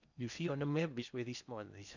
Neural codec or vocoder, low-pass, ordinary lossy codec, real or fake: codec, 16 kHz in and 24 kHz out, 0.8 kbps, FocalCodec, streaming, 65536 codes; 7.2 kHz; none; fake